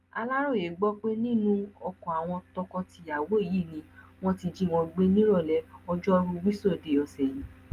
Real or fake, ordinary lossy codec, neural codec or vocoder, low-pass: real; Opus, 32 kbps; none; 14.4 kHz